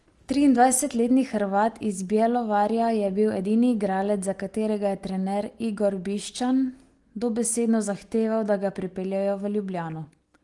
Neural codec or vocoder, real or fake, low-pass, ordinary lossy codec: none; real; 10.8 kHz; Opus, 24 kbps